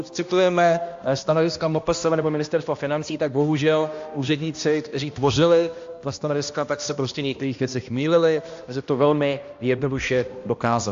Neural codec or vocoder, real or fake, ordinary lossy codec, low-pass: codec, 16 kHz, 1 kbps, X-Codec, HuBERT features, trained on balanced general audio; fake; AAC, 48 kbps; 7.2 kHz